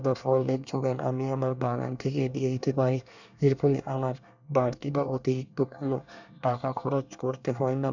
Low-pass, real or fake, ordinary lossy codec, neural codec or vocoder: 7.2 kHz; fake; none; codec, 24 kHz, 1 kbps, SNAC